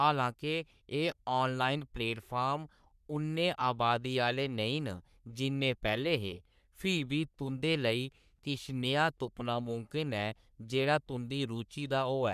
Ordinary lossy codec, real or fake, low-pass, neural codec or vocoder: none; fake; 14.4 kHz; codec, 44.1 kHz, 3.4 kbps, Pupu-Codec